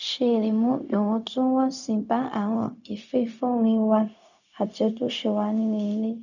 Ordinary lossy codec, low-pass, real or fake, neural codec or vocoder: none; 7.2 kHz; fake; codec, 16 kHz, 0.4 kbps, LongCat-Audio-Codec